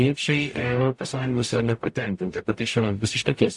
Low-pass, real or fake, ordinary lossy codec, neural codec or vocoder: 10.8 kHz; fake; AAC, 64 kbps; codec, 44.1 kHz, 0.9 kbps, DAC